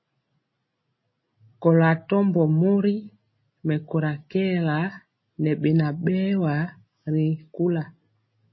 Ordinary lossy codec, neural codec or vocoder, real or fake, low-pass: MP3, 24 kbps; none; real; 7.2 kHz